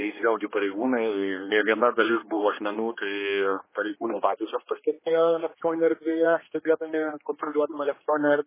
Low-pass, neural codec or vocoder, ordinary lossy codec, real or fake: 3.6 kHz; codec, 16 kHz, 2 kbps, X-Codec, HuBERT features, trained on general audio; MP3, 16 kbps; fake